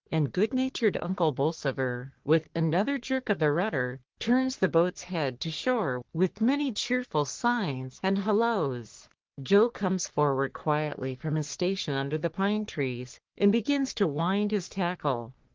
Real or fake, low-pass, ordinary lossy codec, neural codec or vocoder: fake; 7.2 kHz; Opus, 16 kbps; codec, 44.1 kHz, 3.4 kbps, Pupu-Codec